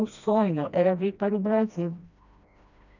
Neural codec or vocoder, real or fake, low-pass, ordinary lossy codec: codec, 16 kHz, 1 kbps, FreqCodec, smaller model; fake; 7.2 kHz; none